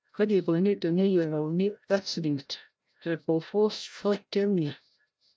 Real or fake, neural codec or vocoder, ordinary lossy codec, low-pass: fake; codec, 16 kHz, 0.5 kbps, FreqCodec, larger model; none; none